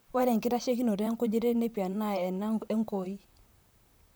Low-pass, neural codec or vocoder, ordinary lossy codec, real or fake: none; vocoder, 44.1 kHz, 128 mel bands, Pupu-Vocoder; none; fake